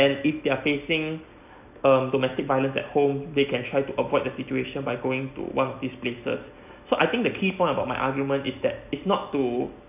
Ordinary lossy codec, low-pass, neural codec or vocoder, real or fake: none; 3.6 kHz; none; real